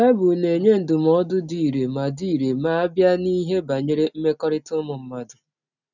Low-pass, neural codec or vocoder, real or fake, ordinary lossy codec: 7.2 kHz; none; real; none